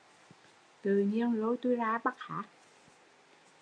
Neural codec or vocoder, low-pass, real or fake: none; 9.9 kHz; real